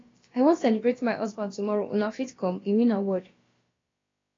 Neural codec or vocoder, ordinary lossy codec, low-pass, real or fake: codec, 16 kHz, about 1 kbps, DyCAST, with the encoder's durations; AAC, 32 kbps; 7.2 kHz; fake